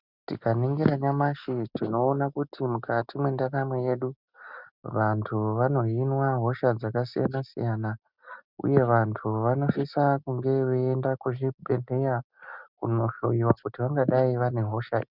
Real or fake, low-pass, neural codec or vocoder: real; 5.4 kHz; none